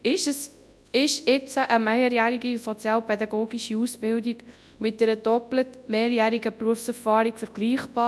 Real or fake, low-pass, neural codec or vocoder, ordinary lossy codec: fake; none; codec, 24 kHz, 0.9 kbps, WavTokenizer, large speech release; none